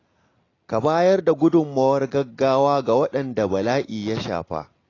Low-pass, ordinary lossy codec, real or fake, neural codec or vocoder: 7.2 kHz; AAC, 32 kbps; real; none